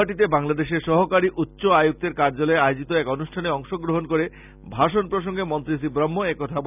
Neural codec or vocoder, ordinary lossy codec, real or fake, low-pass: none; none; real; 3.6 kHz